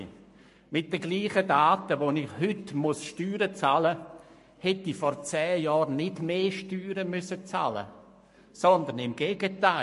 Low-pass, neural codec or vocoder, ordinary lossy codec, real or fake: 14.4 kHz; codec, 44.1 kHz, 7.8 kbps, Pupu-Codec; MP3, 48 kbps; fake